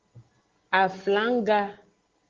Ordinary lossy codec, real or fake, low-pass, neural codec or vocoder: Opus, 16 kbps; real; 7.2 kHz; none